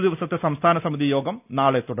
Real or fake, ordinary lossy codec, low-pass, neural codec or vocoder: real; none; 3.6 kHz; none